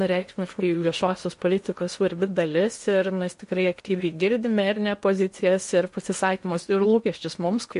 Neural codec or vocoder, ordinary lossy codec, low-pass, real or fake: codec, 16 kHz in and 24 kHz out, 0.8 kbps, FocalCodec, streaming, 65536 codes; MP3, 48 kbps; 10.8 kHz; fake